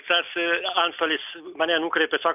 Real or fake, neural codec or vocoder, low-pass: real; none; 3.6 kHz